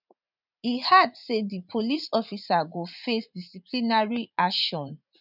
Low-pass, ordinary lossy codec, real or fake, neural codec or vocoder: 5.4 kHz; none; fake; vocoder, 44.1 kHz, 80 mel bands, Vocos